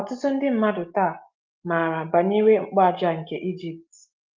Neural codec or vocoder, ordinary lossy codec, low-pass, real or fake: none; Opus, 32 kbps; 7.2 kHz; real